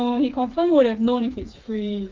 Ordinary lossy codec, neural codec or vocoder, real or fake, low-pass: Opus, 32 kbps; codec, 16 kHz, 4 kbps, FreqCodec, smaller model; fake; 7.2 kHz